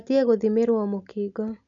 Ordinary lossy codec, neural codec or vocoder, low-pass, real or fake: MP3, 64 kbps; none; 7.2 kHz; real